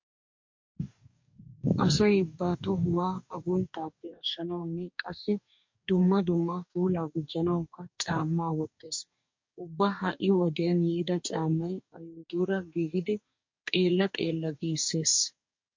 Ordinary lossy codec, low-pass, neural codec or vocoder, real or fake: MP3, 48 kbps; 7.2 kHz; codec, 44.1 kHz, 2.6 kbps, DAC; fake